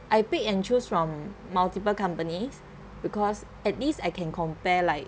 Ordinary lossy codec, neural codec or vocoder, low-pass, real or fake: none; none; none; real